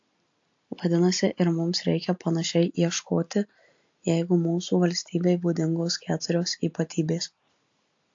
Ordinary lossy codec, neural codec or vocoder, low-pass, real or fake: AAC, 48 kbps; none; 7.2 kHz; real